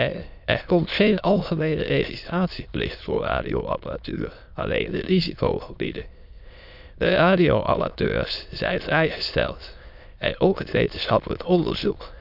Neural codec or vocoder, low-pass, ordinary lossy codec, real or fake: autoencoder, 22.05 kHz, a latent of 192 numbers a frame, VITS, trained on many speakers; 5.4 kHz; none; fake